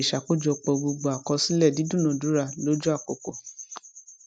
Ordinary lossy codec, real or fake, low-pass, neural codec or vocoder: none; real; none; none